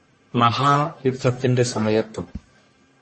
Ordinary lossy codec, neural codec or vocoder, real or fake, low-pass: MP3, 32 kbps; codec, 44.1 kHz, 1.7 kbps, Pupu-Codec; fake; 10.8 kHz